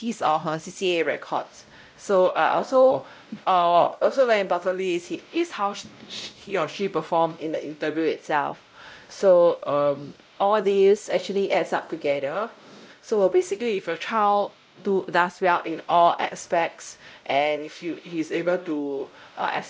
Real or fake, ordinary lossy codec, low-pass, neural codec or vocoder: fake; none; none; codec, 16 kHz, 0.5 kbps, X-Codec, WavLM features, trained on Multilingual LibriSpeech